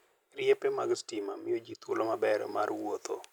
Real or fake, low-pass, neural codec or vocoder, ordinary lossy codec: real; none; none; none